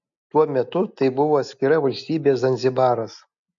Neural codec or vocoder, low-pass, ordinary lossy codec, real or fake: none; 7.2 kHz; AAC, 64 kbps; real